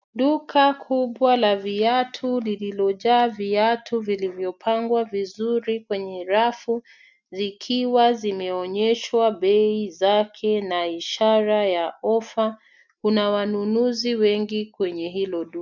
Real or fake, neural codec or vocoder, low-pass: real; none; 7.2 kHz